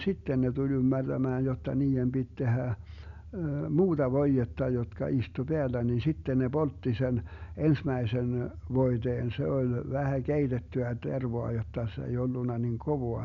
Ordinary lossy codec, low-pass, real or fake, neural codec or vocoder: none; 7.2 kHz; real; none